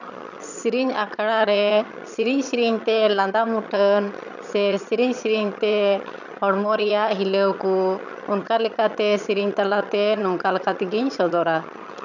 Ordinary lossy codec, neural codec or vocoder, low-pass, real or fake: none; vocoder, 22.05 kHz, 80 mel bands, HiFi-GAN; 7.2 kHz; fake